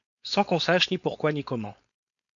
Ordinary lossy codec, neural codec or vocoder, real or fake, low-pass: MP3, 96 kbps; codec, 16 kHz, 4.8 kbps, FACodec; fake; 7.2 kHz